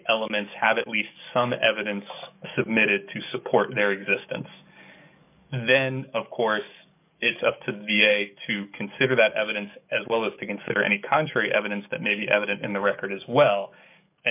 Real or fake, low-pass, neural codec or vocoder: fake; 3.6 kHz; codec, 44.1 kHz, 7.8 kbps, DAC